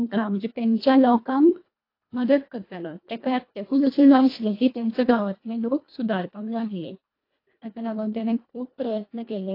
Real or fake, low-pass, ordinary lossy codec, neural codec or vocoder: fake; 5.4 kHz; AAC, 32 kbps; codec, 24 kHz, 1.5 kbps, HILCodec